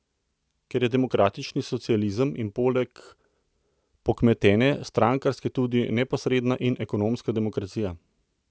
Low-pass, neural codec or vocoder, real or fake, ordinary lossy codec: none; none; real; none